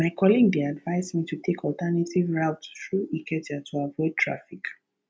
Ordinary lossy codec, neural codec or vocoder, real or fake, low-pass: none; none; real; none